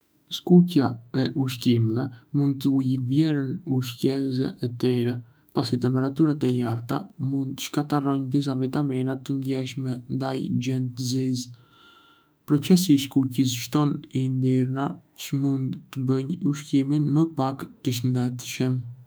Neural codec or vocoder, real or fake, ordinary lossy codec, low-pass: autoencoder, 48 kHz, 32 numbers a frame, DAC-VAE, trained on Japanese speech; fake; none; none